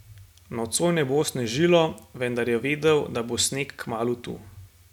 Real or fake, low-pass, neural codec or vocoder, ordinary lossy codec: real; 19.8 kHz; none; none